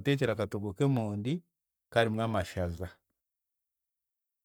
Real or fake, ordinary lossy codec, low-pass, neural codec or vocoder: fake; none; none; codec, 44.1 kHz, 7.8 kbps, Pupu-Codec